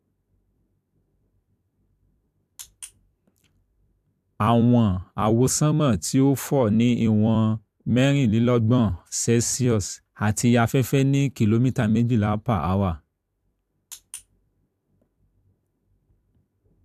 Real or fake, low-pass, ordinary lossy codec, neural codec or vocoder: fake; 14.4 kHz; AAC, 96 kbps; vocoder, 44.1 kHz, 128 mel bands every 256 samples, BigVGAN v2